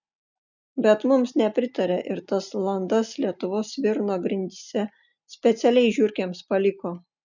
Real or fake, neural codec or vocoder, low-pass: real; none; 7.2 kHz